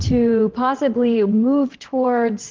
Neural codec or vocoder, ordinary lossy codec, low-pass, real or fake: vocoder, 22.05 kHz, 80 mel bands, WaveNeXt; Opus, 16 kbps; 7.2 kHz; fake